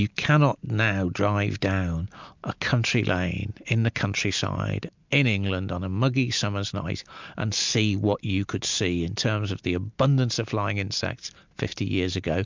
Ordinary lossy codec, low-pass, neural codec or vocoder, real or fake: MP3, 64 kbps; 7.2 kHz; none; real